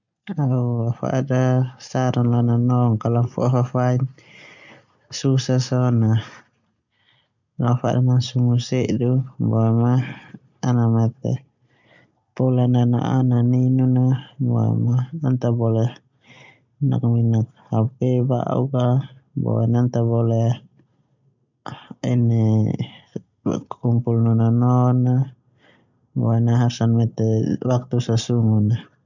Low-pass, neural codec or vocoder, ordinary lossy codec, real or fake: 7.2 kHz; none; none; real